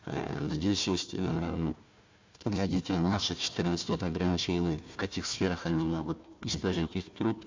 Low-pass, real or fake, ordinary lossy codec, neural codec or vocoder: 7.2 kHz; fake; MP3, 64 kbps; codec, 16 kHz, 1 kbps, FunCodec, trained on Chinese and English, 50 frames a second